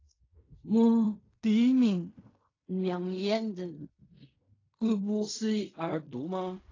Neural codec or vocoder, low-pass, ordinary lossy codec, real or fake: codec, 16 kHz in and 24 kHz out, 0.4 kbps, LongCat-Audio-Codec, fine tuned four codebook decoder; 7.2 kHz; AAC, 32 kbps; fake